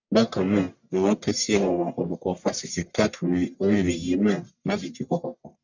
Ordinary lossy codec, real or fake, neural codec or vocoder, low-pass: none; fake; codec, 44.1 kHz, 1.7 kbps, Pupu-Codec; 7.2 kHz